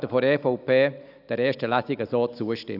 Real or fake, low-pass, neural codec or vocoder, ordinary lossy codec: real; 5.4 kHz; none; none